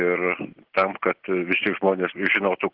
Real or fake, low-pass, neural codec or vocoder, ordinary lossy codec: real; 5.4 kHz; none; Opus, 16 kbps